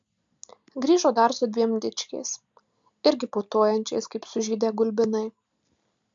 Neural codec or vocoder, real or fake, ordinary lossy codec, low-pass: none; real; AAC, 64 kbps; 7.2 kHz